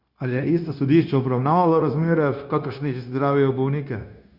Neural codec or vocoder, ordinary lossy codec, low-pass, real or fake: codec, 16 kHz, 0.9 kbps, LongCat-Audio-Codec; Opus, 64 kbps; 5.4 kHz; fake